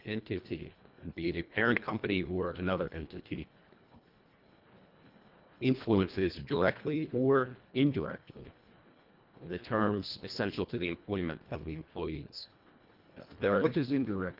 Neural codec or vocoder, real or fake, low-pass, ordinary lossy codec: codec, 24 kHz, 1.5 kbps, HILCodec; fake; 5.4 kHz; Opus, 32 kbps